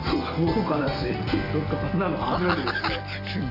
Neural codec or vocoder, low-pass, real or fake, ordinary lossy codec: none; 5.4 kHz; real; none